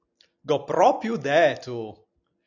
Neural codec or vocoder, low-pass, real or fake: none; 7.2 kHz; real